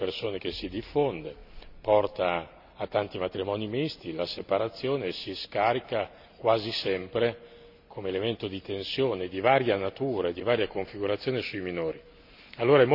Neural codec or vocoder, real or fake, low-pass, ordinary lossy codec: none; real; 5.4 kHz; none